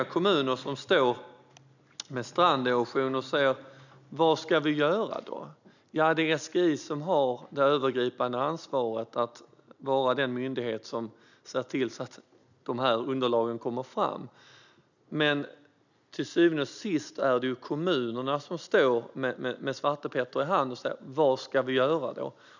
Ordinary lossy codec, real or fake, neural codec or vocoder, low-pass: none; real; none; 7.2 kHz